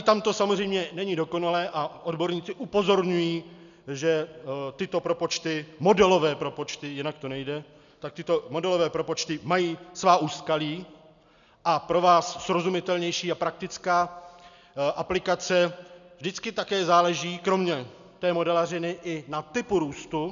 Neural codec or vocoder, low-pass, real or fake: none; 7.2 kHz; real